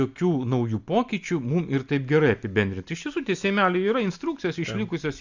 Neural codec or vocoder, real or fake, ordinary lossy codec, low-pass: none; real; Opus, 64 kbps; 7.2 kHz